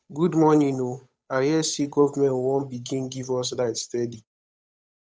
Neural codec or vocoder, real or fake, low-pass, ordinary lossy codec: codec, 16 kHz, 8 kbps, FunCodec, trained on Chinese and English, 25 frames a second; fake; none; none